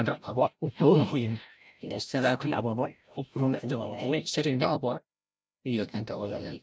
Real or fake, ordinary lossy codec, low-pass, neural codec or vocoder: fake; none; none; codec, 16 kHz, 0.5 kbps, FreqCodec, larger model